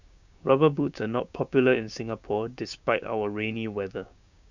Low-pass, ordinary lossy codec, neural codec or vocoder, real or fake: 7.2 kHz; none; codec, 16 kHz, 6 kbps, DAC; fake